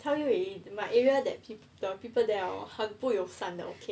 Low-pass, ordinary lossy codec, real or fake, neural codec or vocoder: none; none; real; none